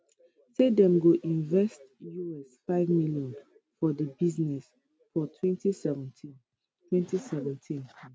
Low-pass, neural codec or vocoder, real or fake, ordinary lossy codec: none; none; real; none